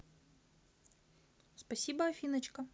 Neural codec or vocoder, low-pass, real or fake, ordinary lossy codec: none; none; real; none